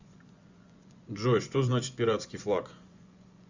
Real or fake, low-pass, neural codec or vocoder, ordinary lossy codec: fake; 7.2 kHz; vocoder, 24 kHz, 100 mel bands, Vocos; Opus, 64 kbps